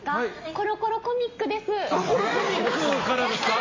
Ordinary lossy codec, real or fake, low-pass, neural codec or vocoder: MP3, 32 kbps; real; 7.2 kHz; none